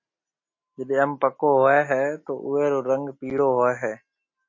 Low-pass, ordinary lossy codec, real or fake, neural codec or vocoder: 7.2 kHz; MP3, 32 kbps; real; none